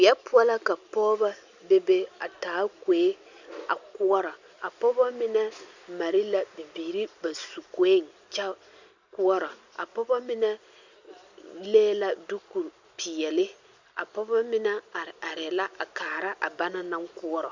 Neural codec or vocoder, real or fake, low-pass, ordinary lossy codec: none; real; 7.2 kHz; Opus, 64 kbps